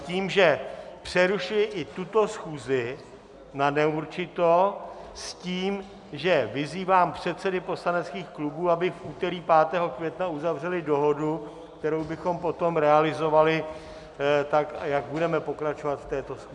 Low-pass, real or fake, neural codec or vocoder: 10.8 kHz; real; none